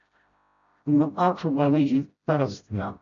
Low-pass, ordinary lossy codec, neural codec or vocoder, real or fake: 7.2 kHz; MP3, 96 kbps; codec, 16 kHz, 0.5 kbps, FreqCodec, smaller model; fake